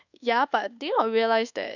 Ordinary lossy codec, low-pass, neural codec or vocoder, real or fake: none; 7.2 kHz; codec, 24 kHz, 1.2 kbps, DualCodec; fake